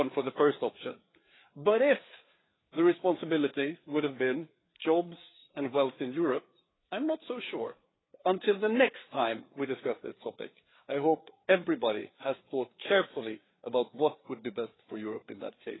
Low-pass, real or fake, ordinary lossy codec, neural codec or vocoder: 7.2 kHz; fake; AAC, 16 kbps; codec, 16 kHz, 4 kbps, FreqCodec, larger model